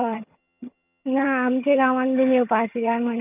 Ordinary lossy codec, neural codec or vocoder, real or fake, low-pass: none; vocoder, 22.05 kHz, 80 mel bands, HiFi-GAN; fake; 3.6 kHz